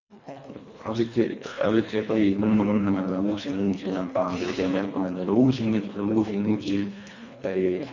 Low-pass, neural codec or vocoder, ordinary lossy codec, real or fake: 7.2 kHz; codec, 24 kHz, 1.5 kbps, HILCodec; none; fake